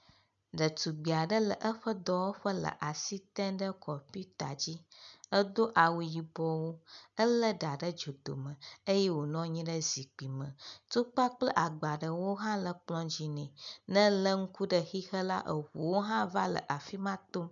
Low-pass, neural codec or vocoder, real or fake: 7.2 kHz; none; real